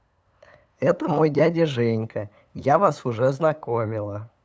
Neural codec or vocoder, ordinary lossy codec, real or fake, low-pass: codec, 16 kHz, 8 kbps, FunCodec, trained on LibriTTS, 25 frames a second; none; fake; none